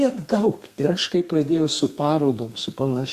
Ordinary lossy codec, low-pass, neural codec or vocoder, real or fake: Opus, 64 kbps; 14.4 kHz; codec, 32 kHz, 1.9 kbps, SNAC; fake